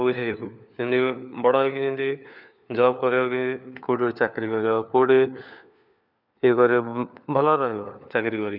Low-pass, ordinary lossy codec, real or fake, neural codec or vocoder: 5.4 kHz; none; fake; codec, 16 kHz, 4 kbps, FunCodec, trained on LibriTTS, 50 frames a second